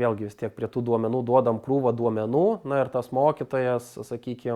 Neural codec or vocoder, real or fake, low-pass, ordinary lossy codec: none; real; 19.8 kHz; Opus, 64 kbps